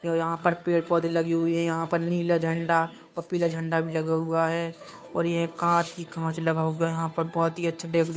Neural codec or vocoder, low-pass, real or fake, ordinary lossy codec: codec, 16 kHz, 2 kbps, FunCodec, trained on Chinese and English, 25 frames a second; none; fake; none